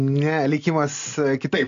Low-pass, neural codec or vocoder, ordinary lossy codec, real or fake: 7.2 kHz; none; AAC, 64 kbps; real